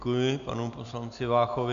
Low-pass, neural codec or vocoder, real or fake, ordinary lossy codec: 7.2 kHz; none; real; MP3, 96 kbps